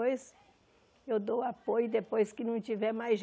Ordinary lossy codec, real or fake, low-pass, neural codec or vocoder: none; real; none; none